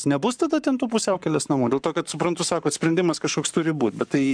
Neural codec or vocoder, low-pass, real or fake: codec, 44.1 kHz, 7.8 kbps, Pupu-Codec; 9.9 kHz; fake